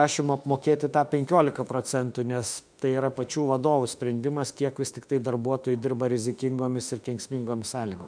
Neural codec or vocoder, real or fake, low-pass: autoencoder, 48 kHz, 32 numbers a frame, DAC-VAE, trained on Japanese speech; fake; 9.9 kHz